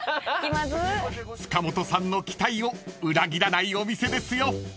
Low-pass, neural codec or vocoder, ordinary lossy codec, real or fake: none; none; none; real